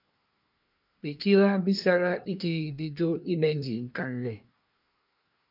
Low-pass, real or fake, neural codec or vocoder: 5.4 kHz; fake; codec, 24 kHz, 1 kbps, SNAC